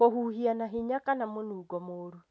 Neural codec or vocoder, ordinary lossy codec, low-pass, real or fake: none; none; none; real